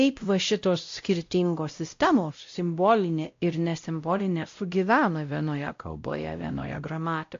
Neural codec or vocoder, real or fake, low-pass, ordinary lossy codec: codec, 16 kHz, 0.5 kbps, X-Codec, WavLM features, trained on Multilingual LibriSpeech; fake; 7.2 kHz; AAC, 48 kbps